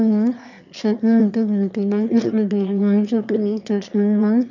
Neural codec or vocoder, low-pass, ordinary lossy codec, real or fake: autoencoder, 22.05 kHz, a latent of 192 numbers a frame, VITS, trained on one speaker; 7.2 kHz; none; fake